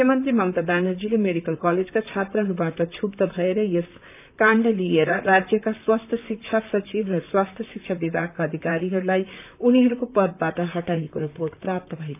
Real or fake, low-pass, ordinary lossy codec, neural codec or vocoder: fake; 3.6 kHz; none; vocoder, 44.1 kHz, 128 mel bands, Pupu-Vocoder